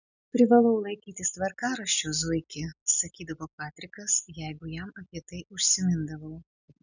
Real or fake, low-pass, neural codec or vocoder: real; 7.2 kHz; none